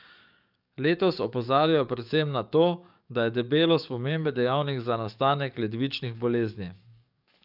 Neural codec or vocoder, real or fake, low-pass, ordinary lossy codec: codec, 44.1 kHz, 7.8 kbps, DAC; fake; 5.4 kHz; none